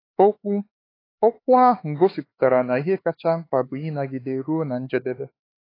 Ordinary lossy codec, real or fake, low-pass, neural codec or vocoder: AAC, 24 kbps; fake; 5.4 kHz; codec, 16 kHz, 4 kbps, X-Codec, HuBERT features, trained on LibriSpeech